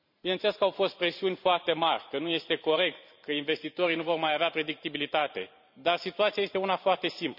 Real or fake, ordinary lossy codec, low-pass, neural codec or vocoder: fake; none; 5.4 kHz; vocoder, 44.1 kHz, 128 mel bands every 512 samples, BigVGAN v2